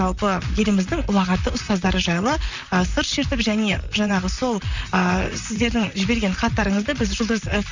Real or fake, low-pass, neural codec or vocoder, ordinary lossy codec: fake; 7.2 kHz; vocoder, 44.1 kHz, 128 mel bands, Pupu-Vocoder; Opus, 64 kbps